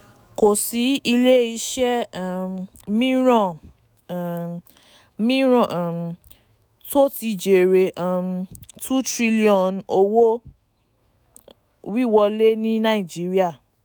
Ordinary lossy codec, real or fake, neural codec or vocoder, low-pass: none; fake; autoencoder, 48 kHz, 128 numbers a frame, DAC-VAE, trained on Japanese speech; none